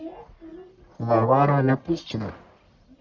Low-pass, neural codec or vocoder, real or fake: 7.2 kHz; codec, 44.1 kHz, 1.7 kbps, Pupu-Codec; fake